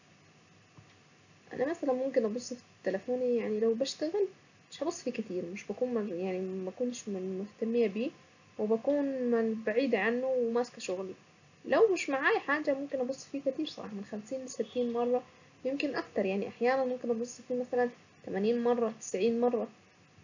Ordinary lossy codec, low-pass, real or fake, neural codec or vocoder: none; 7.2 kHz; real; none